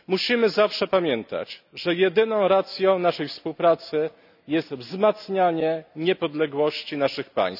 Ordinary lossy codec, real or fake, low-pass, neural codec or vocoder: none; real; 5.4 kHz; none